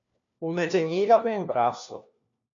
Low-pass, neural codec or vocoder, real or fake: 7.2 kHz; codec, 16 kHz, 1 kbps, FunCodec, trained on LibriTTS, 50 frames a second; fake